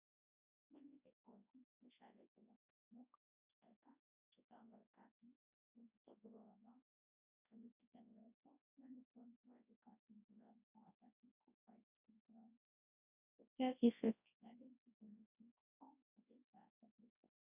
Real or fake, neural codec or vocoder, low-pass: fake; codec, 24 kHz, 0.9 kbps, WavTokenizer, large speech release; 3.6 kHz